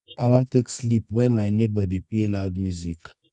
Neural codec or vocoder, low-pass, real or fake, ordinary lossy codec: codec, 24 kHz, 0.9 kbps, WavTokenizer, medium music audio release; 10.8 kHz; fake; none